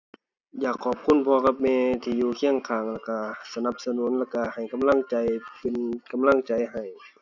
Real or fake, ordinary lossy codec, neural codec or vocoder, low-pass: real; none; none; 7.2 kHz